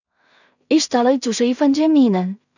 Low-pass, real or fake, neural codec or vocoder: 7.2 kHz; fake; codec, 16 kHz in and 24 kHz out, 0.4 kbps, LongCat-Audio-Codec, two codebook decoder